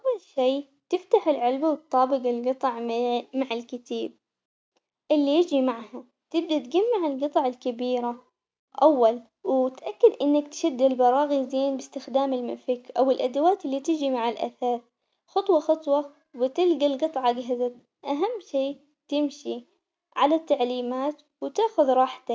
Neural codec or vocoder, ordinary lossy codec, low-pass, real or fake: none; none; none; real